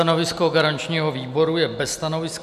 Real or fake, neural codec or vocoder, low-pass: real; none; 14.4 kHz